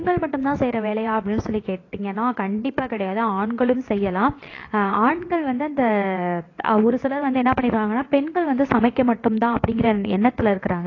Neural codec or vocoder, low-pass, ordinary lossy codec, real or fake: vocoder, 22.05 kHz, 80 mel bands, WaveNeXt; 7.2 kHz; AAC, 32 kbps; fake